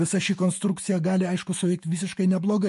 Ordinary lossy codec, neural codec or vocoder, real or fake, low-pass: MP3, 48 kbps; autoencoder, 48 kHz, 128 numbers a frame, DAC-VAE, trained on Japanese speech; fake; 14.4 kHz